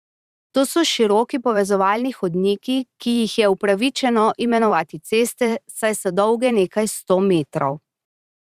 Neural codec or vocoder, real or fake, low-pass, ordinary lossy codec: vocoder, 44.1 kHz, 128 mel bands every 512 samples, BigVGAN v2; fake; 14.4 kHz; Opus, 64 kbps